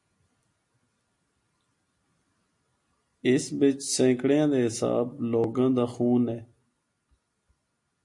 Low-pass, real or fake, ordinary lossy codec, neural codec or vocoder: 10.8 kHz; real; MP3, 48 kbps; none